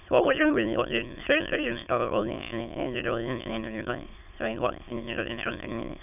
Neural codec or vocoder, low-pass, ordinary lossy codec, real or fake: autoencoder, 22.05 kHz, a latent of 192 numbers a frame, VITS, trained on many speakers; 3.6 kHz; none; fake